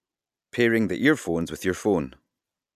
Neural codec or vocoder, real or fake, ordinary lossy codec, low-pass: none; real; none; 14.4 kHz